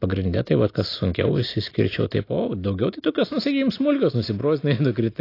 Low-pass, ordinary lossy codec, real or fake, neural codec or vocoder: 5.4 kHz; AAC, 32 kbps; real; none